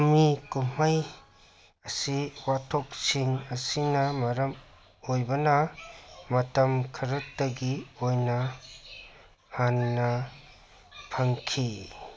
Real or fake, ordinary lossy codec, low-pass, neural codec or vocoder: real; none; none; none